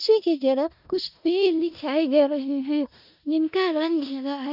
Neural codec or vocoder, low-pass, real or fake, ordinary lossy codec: codec, 16 kHz in and 24 kHz out, 0.4 kbps, LongCat-Audio-Codec, four codebook decoder; 5.4 kHz; fake; none